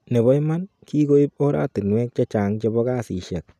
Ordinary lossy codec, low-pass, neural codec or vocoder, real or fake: none; 14.4 kHz; none; real